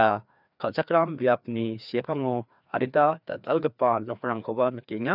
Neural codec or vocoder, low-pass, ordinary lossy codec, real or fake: codec, 16 kHz, 2 kbps, FreqCodec, larger model; 5.4 kHz; none; fake